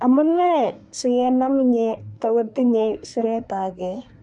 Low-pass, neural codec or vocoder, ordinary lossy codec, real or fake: 10.8 kHz; codec, 24 kHz, 1 kbps, SNAC; none; fake